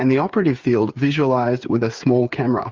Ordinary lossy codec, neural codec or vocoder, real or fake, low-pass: Opus, 32 kbps; codec, 16 kHz, 8 kbps, FreqCodec, smaller model; fake; 7.2 kHz